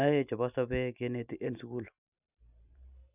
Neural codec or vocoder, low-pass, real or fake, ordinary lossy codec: none; 3.6 kHz; real; none